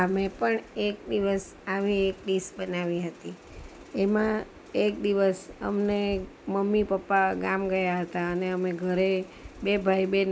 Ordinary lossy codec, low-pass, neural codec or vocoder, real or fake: none; none; none; real